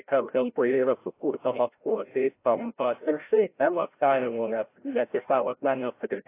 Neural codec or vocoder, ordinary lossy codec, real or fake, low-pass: codec, 16 kHz, 0.5 kbps, FreqCodec, larger model; AAC, 24 kbps; fake; 3.6 kHz